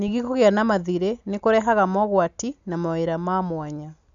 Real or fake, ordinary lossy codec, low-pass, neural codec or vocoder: real; none; 7.2 kHz; none